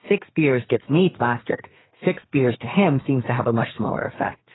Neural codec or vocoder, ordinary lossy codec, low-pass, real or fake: codec, 32 kHz, 1.9 kbps, SNAC; AAC, 16 kbps; 7.2 kHz; fake